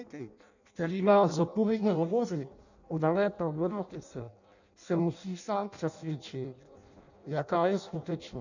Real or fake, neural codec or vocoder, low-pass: fake; codec, 16 kHz in and 24 kHz out, 0.6 kbps, FireRedTTS-2 codec; 7.2 kHz